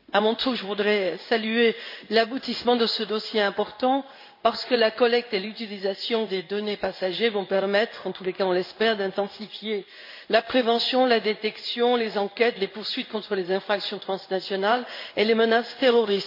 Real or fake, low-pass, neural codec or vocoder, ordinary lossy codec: fake; 5.4 kHz; codec, 16 kHz in and 24 kHz out, 1 kbps, XY-Tokenizer; MP3, 32 kbps